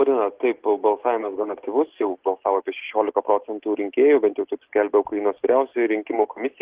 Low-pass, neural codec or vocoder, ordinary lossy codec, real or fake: 3.6 kHz; none; Opus, 16 kbps; real